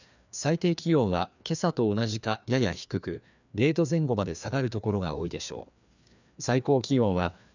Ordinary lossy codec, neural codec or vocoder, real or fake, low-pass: none; codec, 16 kHz, 2 kbps, FreqCodec, larger model; fake; 7.2 kHz